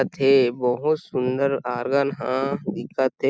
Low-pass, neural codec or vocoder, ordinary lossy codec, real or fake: none; none; none; real